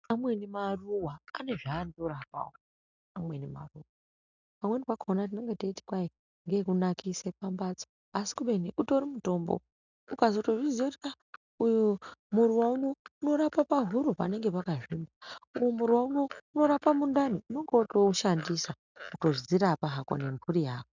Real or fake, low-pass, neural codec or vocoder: real; 7.2 kHz; none